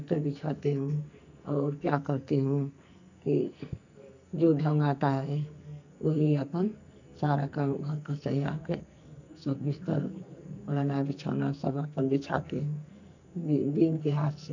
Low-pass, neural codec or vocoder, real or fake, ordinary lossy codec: 7.2 kHz; codec, 44.1 kHz, 2.6 kbps, SNAC; fake; none